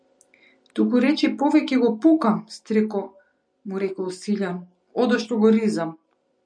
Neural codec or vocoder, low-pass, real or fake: none; 9.9 kHz; real